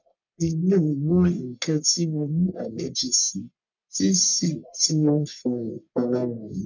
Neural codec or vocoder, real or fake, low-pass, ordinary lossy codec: codec, 44.1 kHz, 1.7 kbps, Pupu-Codec; fake; 7.2 kHz; none